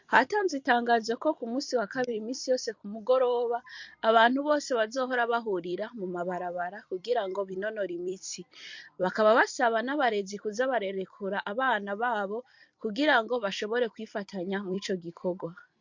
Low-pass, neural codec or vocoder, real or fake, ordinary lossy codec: 7.2 kHz; vocoder, 44.1 kHz, 128 mel bands every 256 samples, BigVGAN v2; fake; MP3, 48 kbps